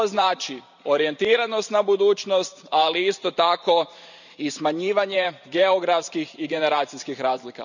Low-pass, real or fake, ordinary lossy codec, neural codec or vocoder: 7.2 kHz; fake; none; vocoder, 44.1 kHz, 128 mel bands every 512 samples, BigVGAN v2